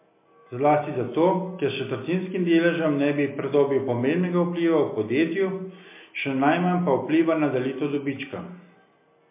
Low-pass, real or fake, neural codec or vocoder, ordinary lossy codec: 3.6 kHz; real; none; MP3, 24 kbps